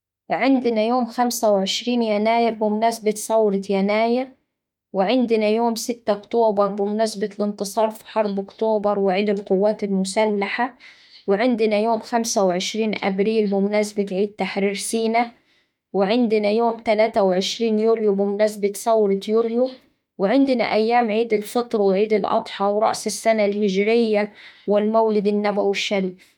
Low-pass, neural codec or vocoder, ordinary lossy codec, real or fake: 19.8 kHz; autoencoder, 48 kHz, 32 numbers a frame, DAC-VAE, trained on Japanese speech; MP3, 96 kbps; fake